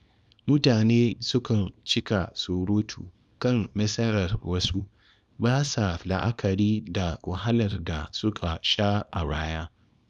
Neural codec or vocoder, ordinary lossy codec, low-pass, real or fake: codec, 24 kHz, 0.9 kbps, WavTokenizer, small release; none; none; fake